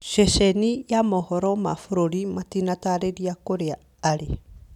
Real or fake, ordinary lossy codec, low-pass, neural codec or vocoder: real; none; 19.8 kHz; none